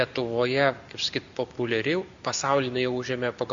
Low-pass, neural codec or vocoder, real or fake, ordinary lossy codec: 7.2 kHz; none; real; Opus, 64 kbps